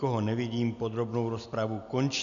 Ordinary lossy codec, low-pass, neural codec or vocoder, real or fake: MP3, 96 kbps; 7.2 kHz; none; real